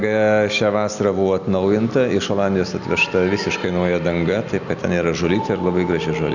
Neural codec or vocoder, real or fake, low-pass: none; real; 7.2 kHz